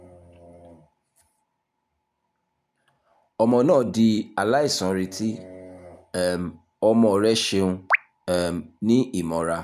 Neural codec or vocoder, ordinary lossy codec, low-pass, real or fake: none; none; 14.4 kHz; real